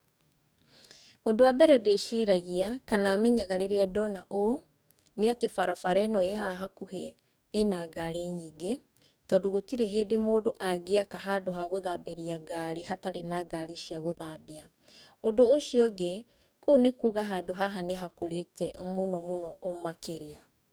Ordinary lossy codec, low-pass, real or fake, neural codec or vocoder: none; none; fake; codec, 44.1 kHz, 2.6 kbps, DAC